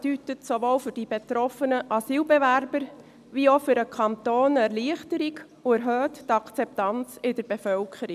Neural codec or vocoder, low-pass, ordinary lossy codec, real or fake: none; 14.4 kHz; none; real